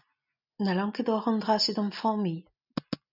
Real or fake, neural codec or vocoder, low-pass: real; none; 5.4 kHz